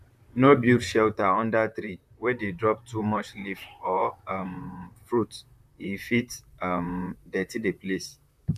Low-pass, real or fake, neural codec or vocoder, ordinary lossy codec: 14.4 kHz; fake; vocoder, 44.1 kHz, 128 mel bands, Pupu-Vocoder; none